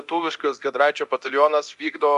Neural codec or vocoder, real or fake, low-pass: codec, 24 kHz, 0.9 kbps, DualCodec; fake; 10.8 kHz